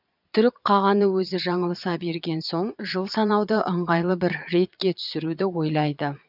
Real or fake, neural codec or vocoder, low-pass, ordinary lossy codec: fake; vocoder, 22.05 kHz, 80 mel bands, Vocos; 5.4 kHz; none